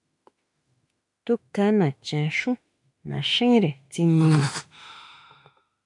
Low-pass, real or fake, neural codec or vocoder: 10.8 kHz; fake; autoencoder, 48 kHz, 32 numbers a frame, DAC-VAE, trained on Japanese speech